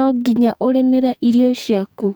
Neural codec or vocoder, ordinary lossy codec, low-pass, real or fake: codec, 44.1 kHz, 2.6 kbps, SNAC; none; none; fake